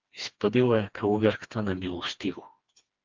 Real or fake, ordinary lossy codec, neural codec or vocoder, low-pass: fake; Opus, 32 kbps; codec, 16 kHz, 2 kbps, FreqCodec, smaller model; 7.2 kHz